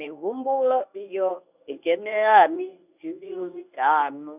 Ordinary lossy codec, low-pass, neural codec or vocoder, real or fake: none; 3.6 kHz; codec, 24 kHz, 0.9 kbps, WavTokenizer, medium speech release version 1; fake